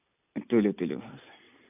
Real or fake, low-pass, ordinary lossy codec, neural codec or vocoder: real; 3.6 kHz; none; none